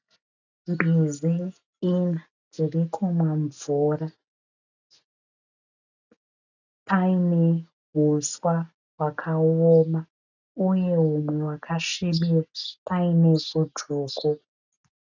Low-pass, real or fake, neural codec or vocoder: 7.2 kHz; real; none